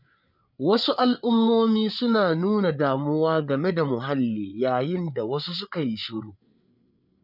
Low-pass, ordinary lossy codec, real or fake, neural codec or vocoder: 5.4 kHz; none; fake; codec, 16 kHz, 8 kbps, FreqCodec, larger model